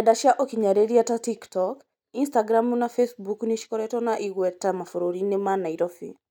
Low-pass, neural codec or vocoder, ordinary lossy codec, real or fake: none; none; none; real